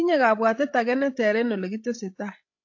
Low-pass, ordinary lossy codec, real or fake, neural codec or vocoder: 7.2 kHz; AAC, 48 kbps; fake; codec, 16 kHz, 16 kbps, FreqCodec, larger model